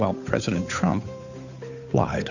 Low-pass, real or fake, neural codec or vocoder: 7.2 kHz; fake; codec, 16 kHz, 8 kbps, FunCodec, trained on Chinese and English, 25 frames a second